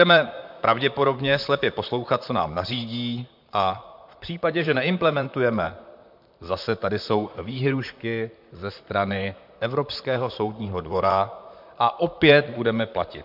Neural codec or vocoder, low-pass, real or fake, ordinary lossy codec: vocoder, 44.1 kHz, 128 mel bands, Pupu-Vocoder; 5.4 kHz; fake; MP3, 48 kbps